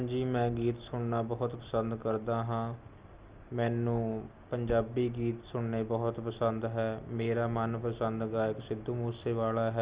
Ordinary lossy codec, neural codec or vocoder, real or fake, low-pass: Opus, 32 kbps; none; real; 3.6 kHz